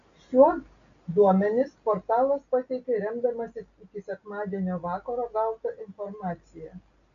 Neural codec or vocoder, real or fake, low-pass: none; real; 7.2 kHz